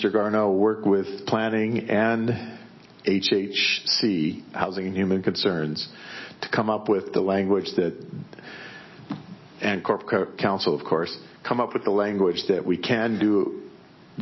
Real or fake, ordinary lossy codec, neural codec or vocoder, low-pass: real; MP3, 24 kbps; none; 7.2 kHz